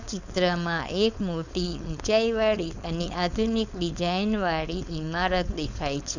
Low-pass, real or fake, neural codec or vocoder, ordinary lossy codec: 7.2 kHz; fake; codec, 16 kHz, 4.8 kbps, FACodec; none